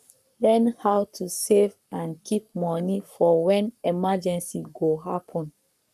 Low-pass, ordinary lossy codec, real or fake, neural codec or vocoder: 14.4 kHz; none; fake; codec, 44.1 kHz, 7.8 kbps, Pupu-Codec